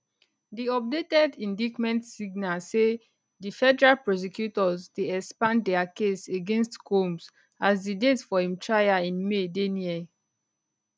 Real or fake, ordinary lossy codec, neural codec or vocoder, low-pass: real; none; none; none